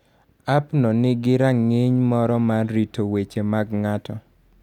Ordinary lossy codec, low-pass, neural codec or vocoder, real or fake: none; 19.8 kHz; none; real